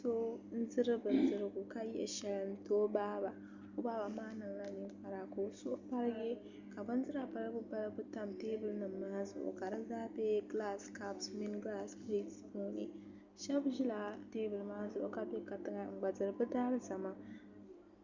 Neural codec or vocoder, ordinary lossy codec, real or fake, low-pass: none; Opus, 64 kbps; real; 7.2 kHz